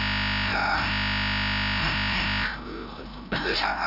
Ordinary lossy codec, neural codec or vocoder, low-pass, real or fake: none; codec, 16 kHz, 0.5 kbps, FreqCodec, larger model; 5.4 kHz; fake